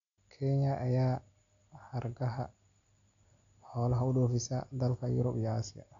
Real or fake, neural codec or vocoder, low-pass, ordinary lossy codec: real; none; 7.2 kHz; none